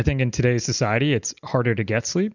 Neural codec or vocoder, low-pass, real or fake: none; 7.2 kHz; real